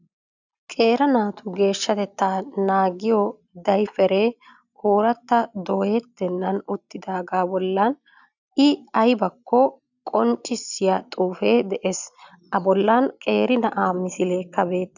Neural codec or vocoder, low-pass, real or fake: none; 7.2 kHz; real